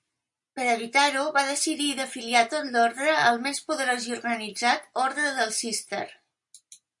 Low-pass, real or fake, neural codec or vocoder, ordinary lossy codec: 10.8 kHz; real; none; MP3, 64 kbps